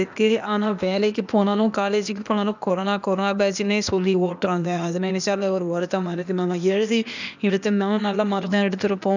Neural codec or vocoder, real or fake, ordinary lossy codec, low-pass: codec, 16 kHz, 0.8 kbps, ZipCodec; fake; none; 7.2 kHz